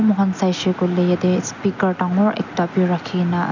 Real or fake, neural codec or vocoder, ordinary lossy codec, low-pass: real; none; none; 7.2 kHz